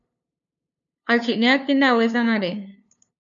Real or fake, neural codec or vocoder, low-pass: fake; codec, 16 kHz, 2 kbps, FunCodec, trained on LibriTTS, 25 frames a second; 7.2 kHz